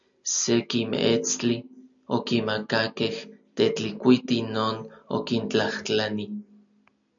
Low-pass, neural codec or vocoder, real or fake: 7.2 kHz; none; real